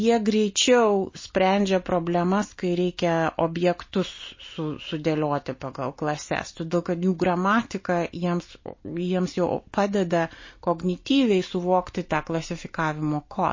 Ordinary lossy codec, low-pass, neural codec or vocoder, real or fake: MP3, 32 kbps; 7.2 kHz; none; real